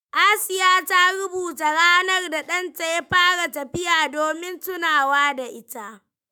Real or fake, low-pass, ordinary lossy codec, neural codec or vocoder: fake; none; none; autoencoder, 48 kHz, 128 numbers a frame, DAC-VAE, trained on Japanese speech